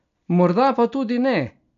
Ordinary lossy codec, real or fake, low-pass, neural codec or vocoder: none; real; 7.2 kHz; none